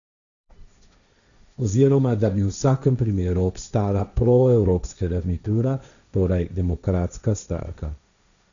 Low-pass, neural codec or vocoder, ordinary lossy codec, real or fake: 7.2 kHz; codec, 16 kHz, 1.1 kbps, Voila-Tokenizer; none; fake